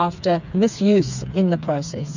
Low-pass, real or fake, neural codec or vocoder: 7.2 kHz; fake; codec, 16 kHz, 4 kbps, FreqCodec, smaller model